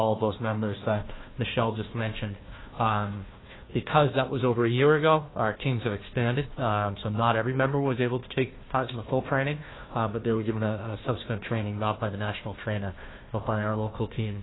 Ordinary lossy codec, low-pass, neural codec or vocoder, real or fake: AAC, 16 kbps; 7.2 kHz; codec, 16 kHz, 1 kbps, FunCodec, trained on Chinese and English, 50 frames a second; fake